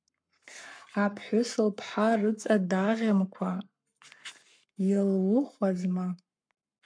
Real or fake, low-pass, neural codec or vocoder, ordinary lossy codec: fake; 9.9 kHz; codec, 44.1 kHz, 7.8 kbps, Pupu-Codec; MP3, 64 kbps